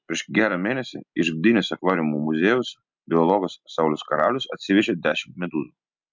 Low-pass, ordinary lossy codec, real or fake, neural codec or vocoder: 7.2 kHz; MP3, 64 kbps; real; none